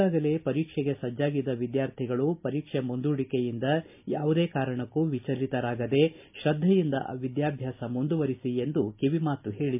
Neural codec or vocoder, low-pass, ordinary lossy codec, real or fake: none; 3.6 kHz; MP3, 32 kbps; real